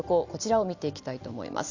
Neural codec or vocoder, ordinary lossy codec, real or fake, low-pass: none; Opus, 64 kbps; real; 7.2 kHz